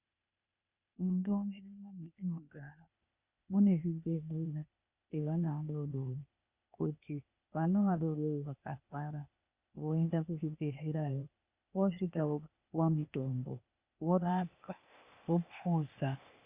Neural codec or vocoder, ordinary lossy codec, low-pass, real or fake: codec, 16 kHz, 0.8 kbps, ZipCodec; Opus, 64 kbps; 3.6 kHz; fake